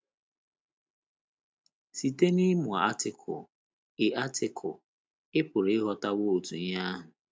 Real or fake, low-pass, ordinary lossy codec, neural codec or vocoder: real; none; none; none